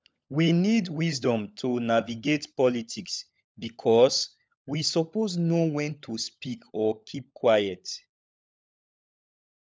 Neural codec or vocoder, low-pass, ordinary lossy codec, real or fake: codec, 16 kHz, 16 kbps, FunCodec, trained on LibriTTS, 50 frames a second; none; none; fake